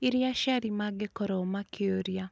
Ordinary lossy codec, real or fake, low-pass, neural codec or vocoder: none; real; none; none